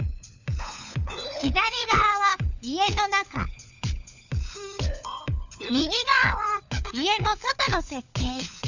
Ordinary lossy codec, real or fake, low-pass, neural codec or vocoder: none; fake; 7.2 kHz; codec, 16 kHz, 4 kbps, FunCodec, trained on LibriTTS, 50 frames a second